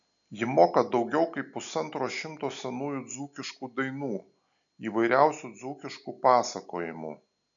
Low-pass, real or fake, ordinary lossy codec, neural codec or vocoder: 7.2 kHz; real; MP3, 96 kbps; none